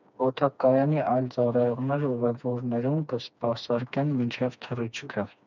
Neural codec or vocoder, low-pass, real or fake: codec, 16 kHz, 2 kbps, FreqCodec, smaller model; 7.2 kHz; fake